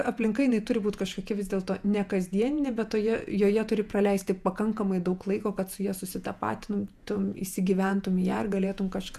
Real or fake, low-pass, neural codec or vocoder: fake; 14.4 kHz; vocoder, 48 kHz, 128 mel bands, Vocos